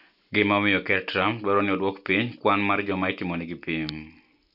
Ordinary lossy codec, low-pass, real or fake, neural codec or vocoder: none; 5.4 kHz; real; none